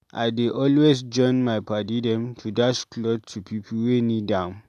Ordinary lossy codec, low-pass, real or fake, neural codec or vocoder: none; 14.4 kHz; real; none